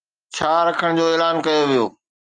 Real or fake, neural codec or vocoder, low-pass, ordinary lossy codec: real; none; 9.9 kHz; Opus, 32 kbps